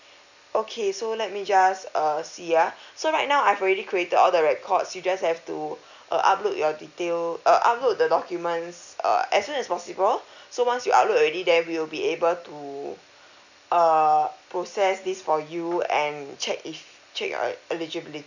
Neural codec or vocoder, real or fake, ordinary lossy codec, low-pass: none; real; none; 7.2 kHz